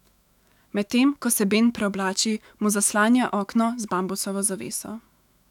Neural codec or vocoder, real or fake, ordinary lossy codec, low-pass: autoencoder, 48 kHz, 128 numbers a frame, DAC-VAE, trained on Japanese speech; fake; none; 19.8 kHz